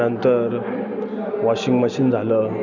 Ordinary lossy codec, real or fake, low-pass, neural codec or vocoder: none; real; 7.2 kHz; none